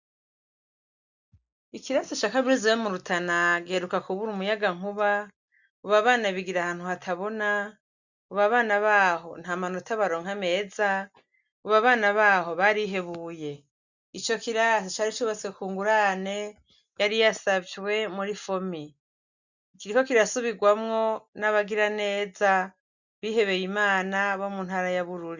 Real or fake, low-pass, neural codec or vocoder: real; 7.2 kHz; none